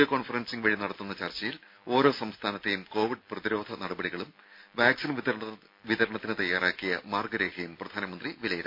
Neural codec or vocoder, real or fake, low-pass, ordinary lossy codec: none; real; 5.4 kHz; MP3, 32 kbps